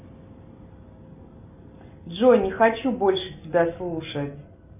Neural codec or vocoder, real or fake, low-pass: none; real; 3.6 kHz